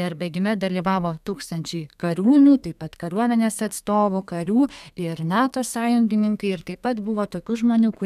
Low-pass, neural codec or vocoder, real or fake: 14.4 kHz; codec, 32 kHz, 1.9 kbps, SNAC; fake